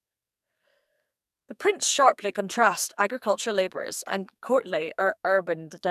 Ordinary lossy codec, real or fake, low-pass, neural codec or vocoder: none; fake; 14.4 kHz; codec, 44.1 kHz, 2.6 kbps, SNAC